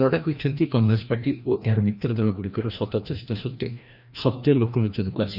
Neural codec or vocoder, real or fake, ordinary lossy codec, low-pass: codec, 16 kHz, 1 kbps, FreqCodec, larger model; fake; none; 5.4 kHz